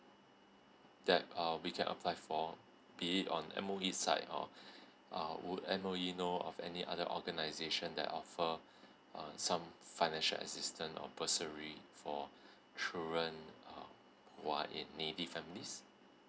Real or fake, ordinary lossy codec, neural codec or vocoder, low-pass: real; none; none; none